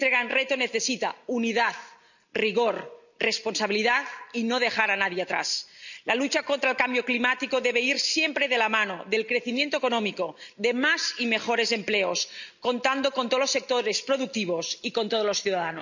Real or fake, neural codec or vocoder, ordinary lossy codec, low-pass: real; none; none; 7.2 kHz